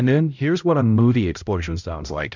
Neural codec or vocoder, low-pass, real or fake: codec, 16 kHz, 0.5 kbps, X-Codec, HuBERT features, trained on balanced general audio; 7.2 kHz; fake